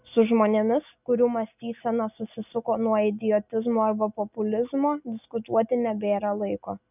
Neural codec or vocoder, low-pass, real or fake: none; 3.6 kHz; real